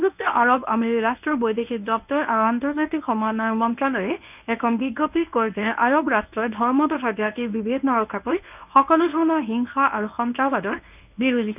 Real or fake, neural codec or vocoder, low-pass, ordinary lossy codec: fake; codec, 24 kHz, 0.9 kbps, WavTokenizer, medium speech release version 1; 3.6 kHz; none